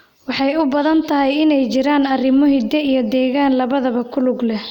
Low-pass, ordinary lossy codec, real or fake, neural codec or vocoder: 19.8 kHz; none; real; none